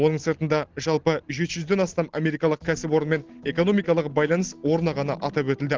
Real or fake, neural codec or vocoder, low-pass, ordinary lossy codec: real; none; 7.2 kHz; Opus, 16 kbps